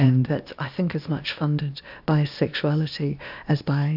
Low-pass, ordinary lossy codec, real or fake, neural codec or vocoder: 5.4 kHz; AAC, 48 kbps; fake; codec, 16 kHz, 0.8 kbps, ZipCodec